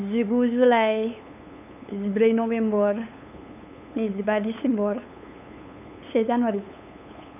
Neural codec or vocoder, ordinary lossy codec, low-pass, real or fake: codec, 16 kHz, 8 kbps, FunCodec, trained on LibriTTS, 25 frames a second; none; 3.6 kHz; fake